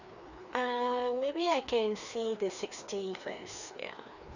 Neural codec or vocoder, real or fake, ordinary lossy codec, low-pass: codec, 16 kHz, 2 kbps, FreqCodec, larger model; fake; none; 7.2 kHz